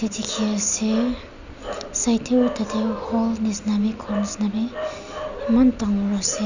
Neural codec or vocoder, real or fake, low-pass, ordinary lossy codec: none; real; 7.2 kHz; none